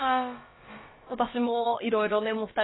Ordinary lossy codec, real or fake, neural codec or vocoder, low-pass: AAC, 16 kbps; fake; codec, 16 kHz, about 1 kbps, DyCAST, with the encoder's durations; 7.2 kHz